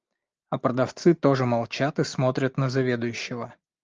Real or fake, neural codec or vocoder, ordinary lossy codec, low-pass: real; none; Opus, 24 kbps; 7.2 kHz